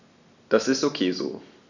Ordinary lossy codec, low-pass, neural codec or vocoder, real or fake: none; 7.2 kHz; none; real